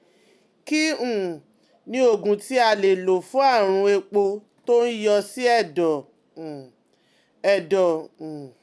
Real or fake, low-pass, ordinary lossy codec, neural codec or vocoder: real; none; none; none